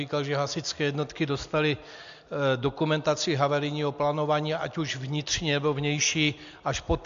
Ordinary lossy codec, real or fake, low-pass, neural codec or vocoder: AAC, 64 kbps; real; 7.2 kHz; none